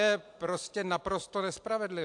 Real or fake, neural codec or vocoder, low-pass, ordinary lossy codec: real; none; 10.8 kHz; AAC, 64 kbps